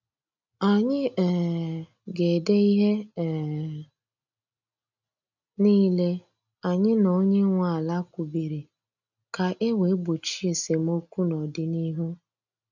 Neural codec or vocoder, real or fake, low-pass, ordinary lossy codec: none; real; 7.2 kHz; none